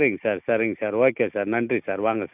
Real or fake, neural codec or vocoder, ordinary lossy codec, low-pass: real; none; none; 3.6 kHz